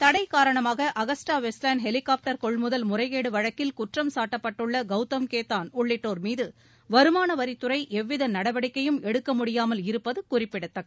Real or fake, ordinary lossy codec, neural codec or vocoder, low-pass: real; none; none; none